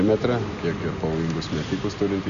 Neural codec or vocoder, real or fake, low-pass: none; real; 7.2 kHz